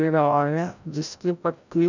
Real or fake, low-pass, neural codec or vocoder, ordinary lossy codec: fake; 7.2 kHz; codec, 16 kHz, 0.5 kbps, FreqCodec, larger model; none